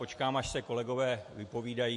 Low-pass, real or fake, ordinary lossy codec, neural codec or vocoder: 10.8 kHz; real; MP3, 48 kbps; none